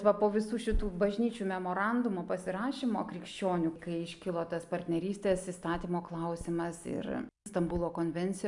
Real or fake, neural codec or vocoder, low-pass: real; none; 10.8 kHz